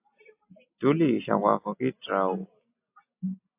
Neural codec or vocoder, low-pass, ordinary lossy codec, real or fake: none; 3.6 kHz; AAC, 24 kbps; real